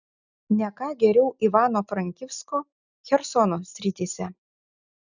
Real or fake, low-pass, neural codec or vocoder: real; 7.2 kHz; none